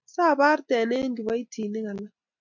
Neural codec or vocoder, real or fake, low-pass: none; real; 7.2 kHz